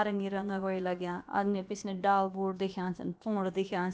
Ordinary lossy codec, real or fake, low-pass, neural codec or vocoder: none; fake; none; codec, 16 kHz, about 1 kbps, DyCAST, with the encoder's durations